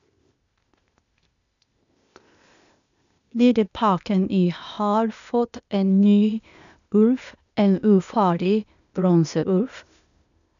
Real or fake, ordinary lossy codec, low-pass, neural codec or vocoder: fake; none; 7.2 kHz; codec, 16 kHz, 0.8 kbps, ZipCodec